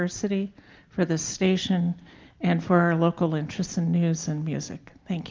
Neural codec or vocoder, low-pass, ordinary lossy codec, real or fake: none; 7.2 kHz; Opus, 32 kbps; real